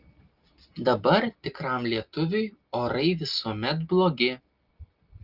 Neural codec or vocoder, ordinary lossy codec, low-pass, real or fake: none; Opus, 32 kbps; 5.4 kHz; real